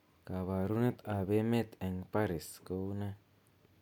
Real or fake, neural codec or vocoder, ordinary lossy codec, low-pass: real; none; none; 19.8 kHz